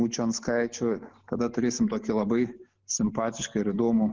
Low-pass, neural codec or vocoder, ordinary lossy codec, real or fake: 7.2 kHz; none; Opus, 16 kbps; real